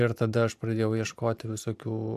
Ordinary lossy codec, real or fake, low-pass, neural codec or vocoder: AAC, 96 kbps; fake; 14.4 kHz; vocoder, 44.1 kHz, 128 mel bands every 512 samples, BigVGAN v2